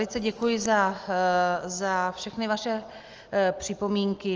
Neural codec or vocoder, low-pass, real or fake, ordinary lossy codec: none; 7.2 kHz; real; Opus, 24 kbps